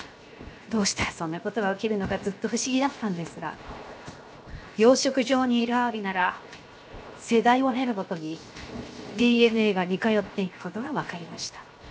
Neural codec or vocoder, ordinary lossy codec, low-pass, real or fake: codec, 16 kHz, 0.7 kbps, FocalCodec; none; none; fake